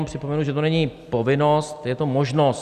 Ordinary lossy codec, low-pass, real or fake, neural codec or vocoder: Opus, 64 kbps; 14.4 kHz; real; none